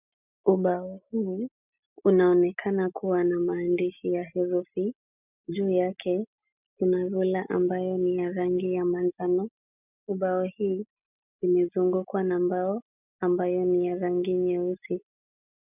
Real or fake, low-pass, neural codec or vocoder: real; 3.6 kHz; none